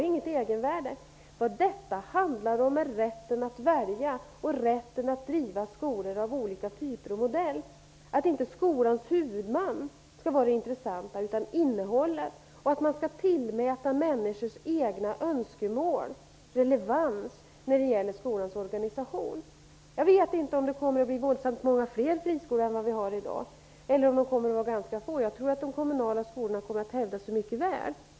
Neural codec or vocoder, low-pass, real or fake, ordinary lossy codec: none; none; real; none